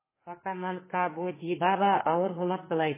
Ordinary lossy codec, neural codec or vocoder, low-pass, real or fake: MP3, 16 kbps; codec, 32 kHz, 1.9 kbps, SNAC; 3.6 kHz; fake